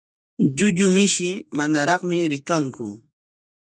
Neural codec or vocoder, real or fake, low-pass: codec, 32 kHz, 1.9 kbps, SNAC; fake; 9.9 kHz